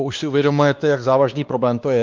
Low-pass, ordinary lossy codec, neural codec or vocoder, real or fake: 7.2 kHz; Opus, 24 kbps; codec, 16 kHz, 2 kbps, X-Codec, WavLM features, trained on Multilingual LibriSpeech; fake